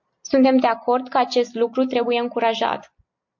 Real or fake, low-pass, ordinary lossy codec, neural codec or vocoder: real; 7.2 kHz; MP3, 48 kbps; none